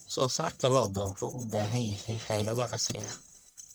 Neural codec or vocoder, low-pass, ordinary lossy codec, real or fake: codec, 44.1 kHz, 1.7 kbps, Pupu-Codec; none; none; fake